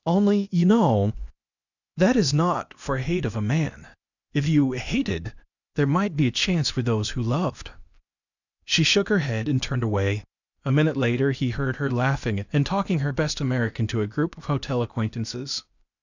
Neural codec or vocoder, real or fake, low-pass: codec, 16 kHz, 0.8 kbps, ZipCodec; fake; 7.2 kHz